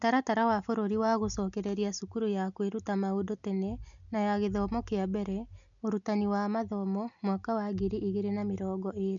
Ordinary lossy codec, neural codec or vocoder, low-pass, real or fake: none; none; 7.2 kHz; real